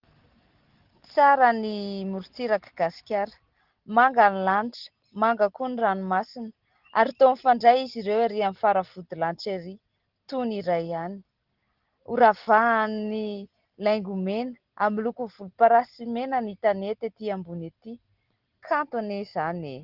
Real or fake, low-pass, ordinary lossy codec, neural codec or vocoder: real; 5.4 kHz; Opus, 16 kbps; none